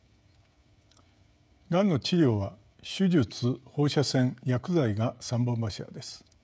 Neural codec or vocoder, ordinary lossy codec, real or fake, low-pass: codec, 16 kHz, 16 kbps, FreqCodec, smaller model; none; fake; none